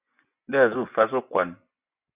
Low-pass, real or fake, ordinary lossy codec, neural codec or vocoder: 3.6 kHz; fake; Opus, 64 kbps; vocoder, 24 kHz, 100 mel bands, Vocos